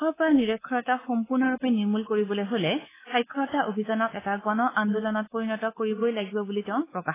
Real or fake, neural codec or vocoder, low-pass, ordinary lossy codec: fake; codec, 24 kHz, 3.1 kbps, DualCodec; 3.6 kHz; AAC, 16 kbps